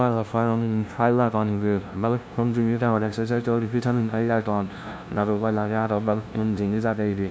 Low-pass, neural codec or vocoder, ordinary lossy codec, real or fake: none; codec, 16 kHz, 0.5 kbps, FunCodec, trained on LibriTTS, 25 frames a second; none; fake